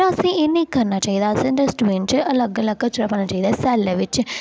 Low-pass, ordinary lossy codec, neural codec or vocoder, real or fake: none; none; none; real